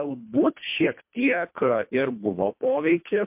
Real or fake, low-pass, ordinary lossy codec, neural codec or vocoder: fake; 3.6 kHz; MP3, 32 kbps; codec, 24 kHz, 1.5 kbps, HILCodec